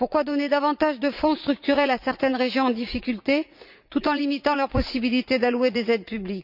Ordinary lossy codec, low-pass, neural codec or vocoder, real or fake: none; 5.4 kHz; vocoder, 44.1 kHz, 80 mel bands, Vocos; fake